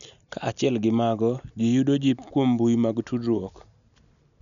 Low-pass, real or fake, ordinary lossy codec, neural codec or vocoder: 7.2 kHz; real; none; none